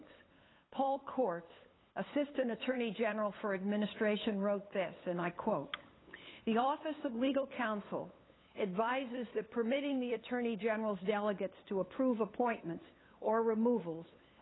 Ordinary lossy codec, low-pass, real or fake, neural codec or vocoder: AAC, 16 kbps; 7.2 kHz; fake; codec, 16 kHz, 8 kbps, FunCodec, trained on LibriTTS, 25 frames a second